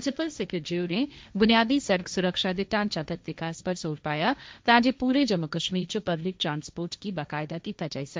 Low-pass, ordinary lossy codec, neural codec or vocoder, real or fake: none; none; codec, 16 kHz, 1.1 kbps, Voila-Tokenizer; fake